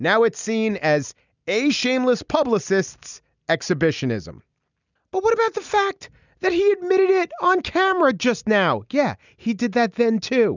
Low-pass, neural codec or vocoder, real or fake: 7.2 kHz; none; real